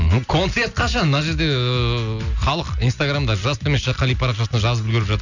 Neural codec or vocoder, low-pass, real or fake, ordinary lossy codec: none; 7.2 kHz; real; none